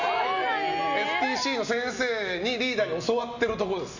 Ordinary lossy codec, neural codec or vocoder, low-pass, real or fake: none; none; 7.2 kHz; real